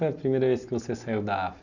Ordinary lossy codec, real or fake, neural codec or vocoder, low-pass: none; real; none; 7.2 kHz